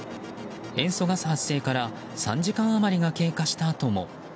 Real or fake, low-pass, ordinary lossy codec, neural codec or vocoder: real; none; none; none